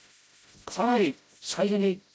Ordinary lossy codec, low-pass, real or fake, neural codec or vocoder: none; none; fake; codec, 16 kHz, 0.5 kbps, FreqCodec, smaller model